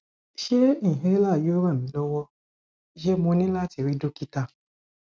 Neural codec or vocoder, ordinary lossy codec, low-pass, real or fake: none; none; none; real